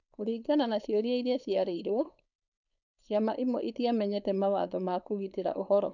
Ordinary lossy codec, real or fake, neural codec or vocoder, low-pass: none; fake; codec, 16 kHz, 4.8 kbps, FACodec; 7.2 kHz